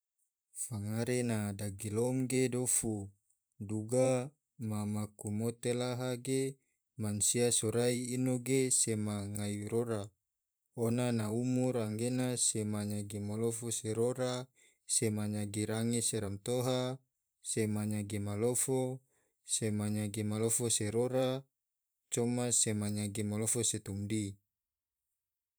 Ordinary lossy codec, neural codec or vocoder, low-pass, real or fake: none; vocoder, 44.1 kHz, 128 mel bands every 512 samples, BigVGAN v2; none; fake